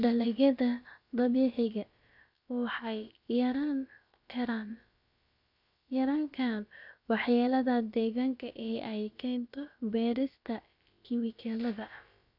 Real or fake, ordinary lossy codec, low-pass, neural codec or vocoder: fake; none; 5.4 kHz; codec, 16 kHz, about 1 kbps, DyCAST, with the encoder's durations